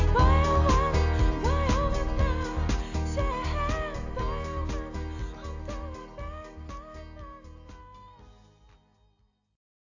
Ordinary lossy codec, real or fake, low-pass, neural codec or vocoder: AAC, 48 kbps; real; 7.2 kHz; none